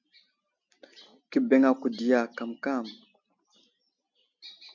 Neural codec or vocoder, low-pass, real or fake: none; 7.2 kHz; real